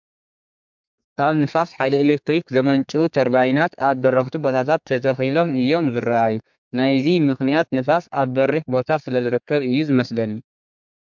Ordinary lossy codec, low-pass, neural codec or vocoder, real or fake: MP3, 64 kbps; 7.2 kHz; codec, 32 kHz, 1.9 kbps, SNAC; fake